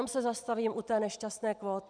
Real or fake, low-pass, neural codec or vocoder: real; 9.9 kHz; none